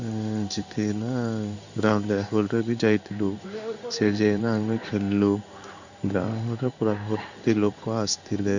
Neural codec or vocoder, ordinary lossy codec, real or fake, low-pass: codec, 16 kHz in and 24 kHz out, 1 kbps, XY-Tokenizer; none; fake; 7.2 kHz